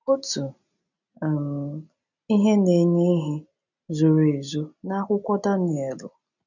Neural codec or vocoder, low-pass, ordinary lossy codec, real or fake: none; 7.2 kHz; none; real